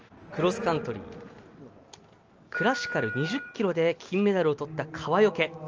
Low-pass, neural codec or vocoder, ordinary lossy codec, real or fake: 7.2 kHz; none; Opus, 24 kbps; real